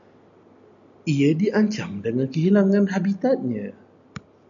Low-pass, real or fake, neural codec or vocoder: 7.2 kHz; real; none